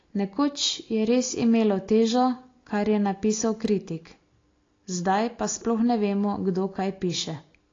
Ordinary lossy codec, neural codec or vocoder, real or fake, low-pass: AAC, 32 kbps; none; real; 7.2 kHz